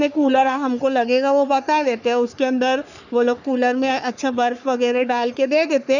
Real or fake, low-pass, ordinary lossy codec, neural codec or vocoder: fake; 7.2 kHz; none; codec, 44.1 kHz, 3.4 kbps, Pupu-Codec